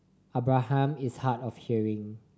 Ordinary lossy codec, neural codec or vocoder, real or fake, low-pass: none; none; real; none